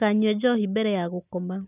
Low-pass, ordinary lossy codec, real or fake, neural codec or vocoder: 3.6 kHz; none; real; none